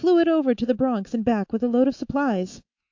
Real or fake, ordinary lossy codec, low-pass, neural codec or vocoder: fake; AAC, 48 kbps; 7.2 kHz; autoencoder, 48 kHz, 128 numbers a frame, DAC-VAE, trained on Japanese speech